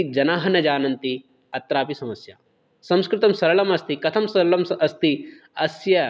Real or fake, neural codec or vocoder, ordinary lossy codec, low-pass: real; none; none; none